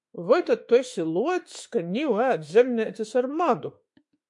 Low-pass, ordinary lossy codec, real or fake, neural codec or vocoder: 10.8 kHz; MP3, 64 kbps; fake; autoencoder, 48 kHz, 32 numbers a frame, DAC-VAE, trained on Japanese speech